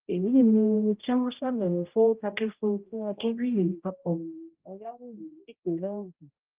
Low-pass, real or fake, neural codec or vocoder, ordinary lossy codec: 3.6 kHz; fake; codec, 16 kHz, 0.5 kbps, X-Codec, HuBERT features, trained on general audio; Opus, 24 kbps